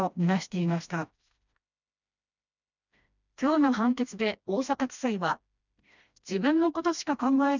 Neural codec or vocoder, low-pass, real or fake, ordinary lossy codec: codec, 16 kHz, 1 kbps, FreqCodec, smaller model; 7.2 kHz; fake; none